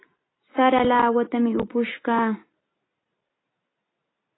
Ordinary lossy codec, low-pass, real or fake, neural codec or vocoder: AAC, 16 kbps; 7.2 kHz; real; none